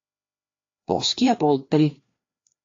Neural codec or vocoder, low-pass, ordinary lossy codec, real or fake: codec, 16 kHz, 2 kbps, FreqCodec, larger model; 7.2 kHz; AAC, 32 kbps; fake